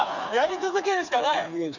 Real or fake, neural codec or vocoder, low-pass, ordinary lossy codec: fake; codec, 16 kHz, 4 kbps, FreqCodec, smaller model; 7.2 kHz; none